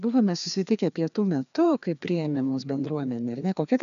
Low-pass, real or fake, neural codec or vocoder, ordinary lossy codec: 7.2 kHz; fake; codec, 16 kHz, 2 kbps, FreqCodec, larger model; MP3, 96 kbps